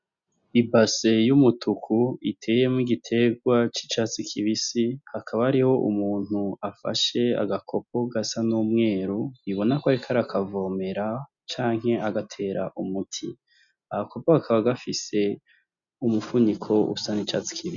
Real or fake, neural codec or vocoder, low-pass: real; none; 7.2 kHz